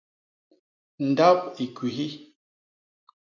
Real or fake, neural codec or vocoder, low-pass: real; none; 7.2 kHz